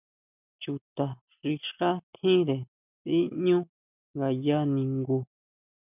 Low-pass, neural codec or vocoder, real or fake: 3.6 kHz; none; real